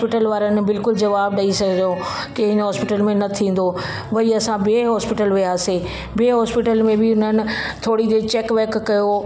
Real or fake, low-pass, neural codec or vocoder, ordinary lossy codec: real; none; none; none